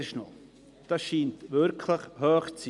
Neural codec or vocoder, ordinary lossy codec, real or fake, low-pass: none; none; real; 10.8 kHz